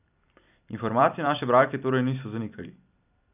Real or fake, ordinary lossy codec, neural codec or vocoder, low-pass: fake; none; vocoder, 44.1 kHz, 128 mel bands every 256 samples, BigVGAN v2; 3.6 kHz